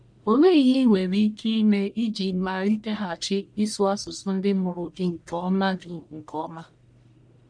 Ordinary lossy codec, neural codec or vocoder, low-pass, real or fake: none; codec, 44.1 kHz, 1.7 kbps, Pupu-Codec; 9.9 kHz; fake